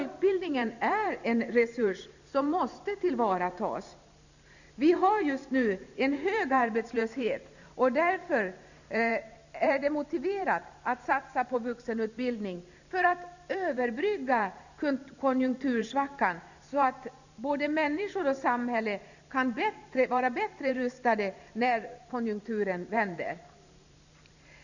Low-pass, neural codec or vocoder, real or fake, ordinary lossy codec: 7.2 kHz; vocoder, 44.1 kHz, 128 mel bands every 512 samples, BigVGAN v2; fake; none